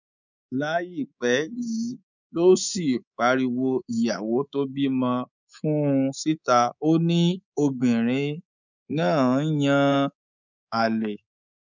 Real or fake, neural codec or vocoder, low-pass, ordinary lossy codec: fake; codec, 24 kHz, 3.1 kbps, DualCodec; 7.2 kHz; none